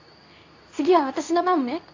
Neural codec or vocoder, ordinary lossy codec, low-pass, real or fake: codec, 24 kHz, 0.9 kbps, WavTokenizer, medium speech release version 2; AAC, 48 kbps; 7.2 kHz; fake